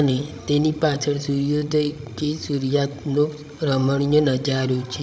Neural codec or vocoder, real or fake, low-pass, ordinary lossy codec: codec, 16 kHz, 16 kbps, FreqCodec, larger model; fake; none; none